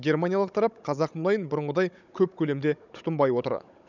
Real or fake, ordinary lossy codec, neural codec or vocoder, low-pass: fake; none; codec, 16 kHz, 16 kbps, FunCodec, trained on Chinese and English, 50 frames a second; 7.2 kHz